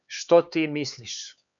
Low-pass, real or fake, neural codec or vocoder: 7.2 kHz; fake; codec, 16 kHz, 4 kbps, X-Codec, HuBERT features, trained on LibriSpeech